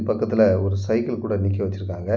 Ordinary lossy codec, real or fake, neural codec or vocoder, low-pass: none; real; none; none